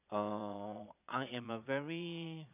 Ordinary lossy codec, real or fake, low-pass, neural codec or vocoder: none; fake; 3.6 kHz; vocoder, 22.05 kHz, 80 mel bands, Vocos